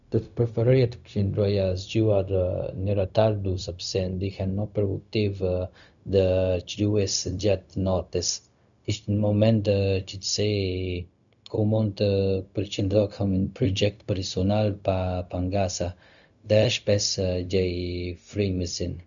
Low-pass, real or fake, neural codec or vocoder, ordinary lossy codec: 7.2 kHz; fake; codec, 16 kHz, 0.4 kbps, LongCat-Audio-Codec; none